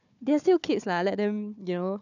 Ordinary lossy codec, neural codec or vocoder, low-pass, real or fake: none; codec, 16 kHz, 4 kbps, FunCodec, trained on Chinese and English, 50 frames a second; 7.2 kHz; fake